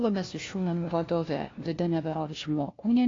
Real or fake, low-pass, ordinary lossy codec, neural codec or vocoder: fake; 7.2 kHz; AAC, 32 kbps; codec, 16 kHz, 1 kbps, FunCodec, trained on LibriTTS, 50 frames a second